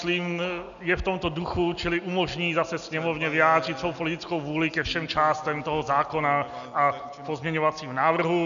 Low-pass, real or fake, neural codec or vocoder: 7.2 kHz; real; none